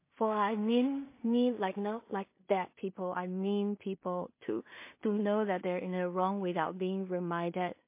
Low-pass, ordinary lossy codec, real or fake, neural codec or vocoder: 3.6 kHz; MP3, 24 kbps; fake; codec, 16 kHz in and 24 kHz out, 0.4 kbps, LongCat-Audio-Codec, two codebook decoder